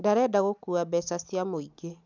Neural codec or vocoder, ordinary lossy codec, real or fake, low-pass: none; none; real; 7.2 kHz